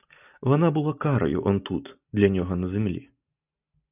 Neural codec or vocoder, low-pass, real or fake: none; 3.6 kHz; real